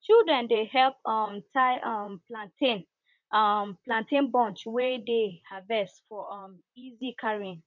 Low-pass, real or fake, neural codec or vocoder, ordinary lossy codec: 7.2 kHz; fake; vocoder, 44.1 kHz, 128 mel bands, Pupu-Vocoder; none